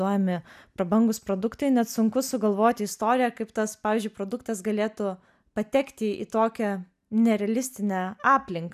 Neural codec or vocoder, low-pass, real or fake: none; 14.4 kHz; real